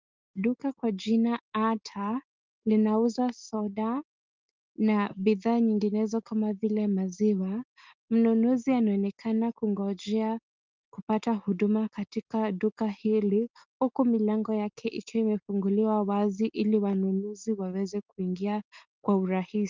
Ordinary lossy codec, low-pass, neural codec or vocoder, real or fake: Opus, 24 kbps; 7.2 kHz; none; real